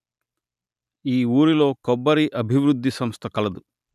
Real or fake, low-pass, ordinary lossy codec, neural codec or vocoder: real; 14.4 kHz; none; none